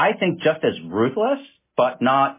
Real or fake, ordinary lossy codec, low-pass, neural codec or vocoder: real; MP3, 16 kbps; 3.6 kHz; none